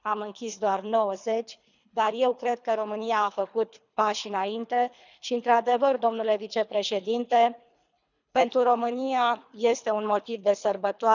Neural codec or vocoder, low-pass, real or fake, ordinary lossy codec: codec, 24 kHz, 3 kbps, HILCodec; 7.2 kHz; fake; none